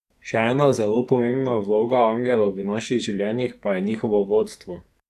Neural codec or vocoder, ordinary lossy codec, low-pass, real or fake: codec, 44.1 kHz, 2.6 kbps, SNAC; none; 14.4 kHz; fake